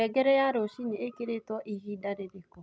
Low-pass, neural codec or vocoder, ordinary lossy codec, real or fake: none; none; none; real